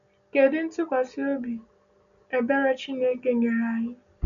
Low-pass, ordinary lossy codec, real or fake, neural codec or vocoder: 7.2 kHz; none; real; none